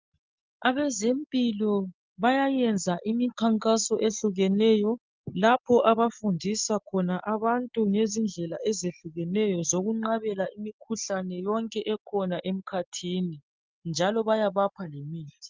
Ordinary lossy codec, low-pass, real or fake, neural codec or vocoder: Opus, 24 kbps; 7.2 kHz; real; none